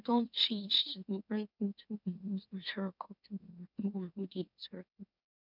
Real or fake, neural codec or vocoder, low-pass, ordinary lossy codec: fake; autoencoder, 44.1 kHz, a latent of 192 numbers a frame, MeloTTS; 5.4 kHz; none